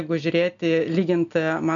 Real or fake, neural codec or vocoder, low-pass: real; none; 7.2 kHz